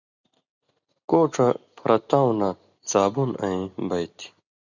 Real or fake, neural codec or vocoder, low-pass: real; none; 7.2 kHz